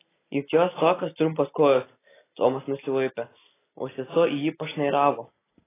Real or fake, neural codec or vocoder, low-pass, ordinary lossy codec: real; none; 3.6 kHz; AAC, 16 kbps